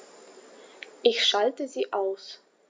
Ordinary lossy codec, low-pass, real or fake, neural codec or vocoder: none; none; real; none